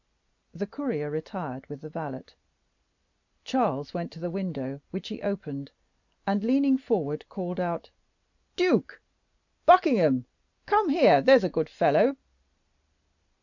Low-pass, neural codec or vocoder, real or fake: 7.2 kHz; none; real